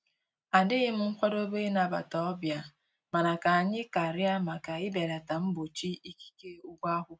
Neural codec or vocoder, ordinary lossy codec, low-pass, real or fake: none; none; none; real